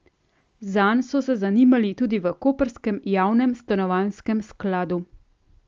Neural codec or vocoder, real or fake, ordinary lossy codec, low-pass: none; real; Opus, 32 kbps; 7.2 kHz